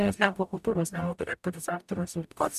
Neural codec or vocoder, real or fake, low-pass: codec, 44.1 kHz, 0.9 kbps, DAC; fake; 14.4 kHz